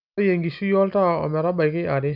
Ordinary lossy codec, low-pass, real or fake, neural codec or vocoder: none; 5.4 kHz; real; none